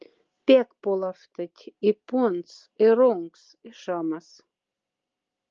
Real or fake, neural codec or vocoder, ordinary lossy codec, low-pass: real; none; Opus, 24 kbps; 7.2 kHz